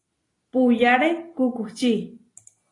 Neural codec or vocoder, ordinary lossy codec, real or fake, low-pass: none; AAC, 32 kbps; real; 10.8 kHz